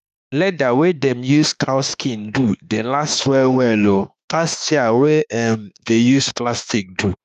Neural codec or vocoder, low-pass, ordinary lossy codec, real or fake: autoencoder, 48 kHz, 32 numbers a frame, DAC-VAE, trained on Japanese speech; 14.4 kHz; none; fake